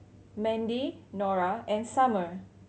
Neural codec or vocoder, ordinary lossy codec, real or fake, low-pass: none; none; real; none